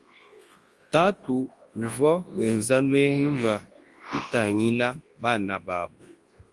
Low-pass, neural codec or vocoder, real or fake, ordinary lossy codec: 10.8 kHz; codec, 24 kHz, 0.9 kbps, WavTokenizer, large speech release; fake; Opus, 24 kbps